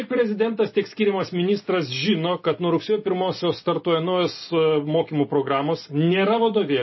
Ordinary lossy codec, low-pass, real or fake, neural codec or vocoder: MP3, 24 kbps; 7.2 kHz; real; none